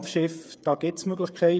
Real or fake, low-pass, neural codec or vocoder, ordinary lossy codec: fake; none; codec, 16 kHz, 8 kbps, FreqCodec, smaller model; none